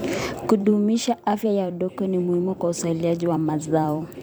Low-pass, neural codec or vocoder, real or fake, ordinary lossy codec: none; vocoder, 44.1 kHz, 128 mel bands every 256 samples, BigVGAN v2; fake; none